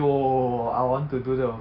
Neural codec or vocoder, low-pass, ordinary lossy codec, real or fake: none; 5.4 kHz; MP3, 48 kbps; real